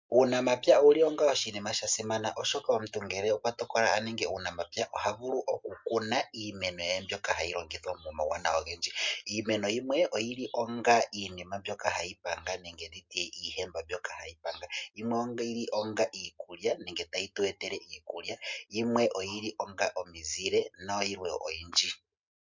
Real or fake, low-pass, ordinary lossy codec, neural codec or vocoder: real; 7.2 kHz; MP3, 64 kbps; none